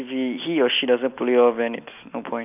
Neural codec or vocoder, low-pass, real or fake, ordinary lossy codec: none; 3.6 kHz; real; none